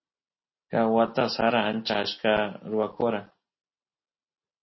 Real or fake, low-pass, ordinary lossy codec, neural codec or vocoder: real; 7.2 kHz; MP3, 24 kbps; none